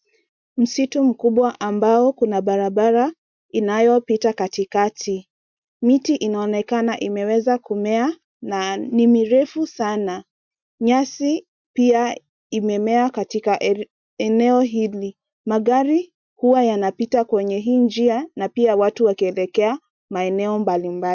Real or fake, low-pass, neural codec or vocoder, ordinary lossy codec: real; 7.2 kHz; none; MP3, 64 kbps